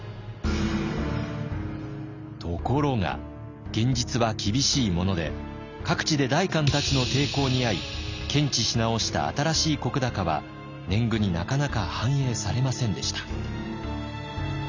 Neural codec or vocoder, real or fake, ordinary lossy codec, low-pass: none; real; none; 7.2 kHz